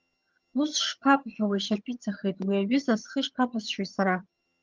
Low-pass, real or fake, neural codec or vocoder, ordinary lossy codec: 7.2 kHz; fake; vocoder, 22.05 kHz, 80 mel bands, HiFi-GAN; Opus, 32 kbps